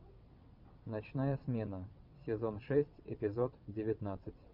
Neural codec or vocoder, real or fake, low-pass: vocoder, 22.05 kHz, 80 mel bands, WaveNeXt; fake; 5.4 kHz